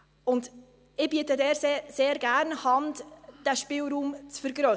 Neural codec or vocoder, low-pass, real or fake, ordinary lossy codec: none; none; real; none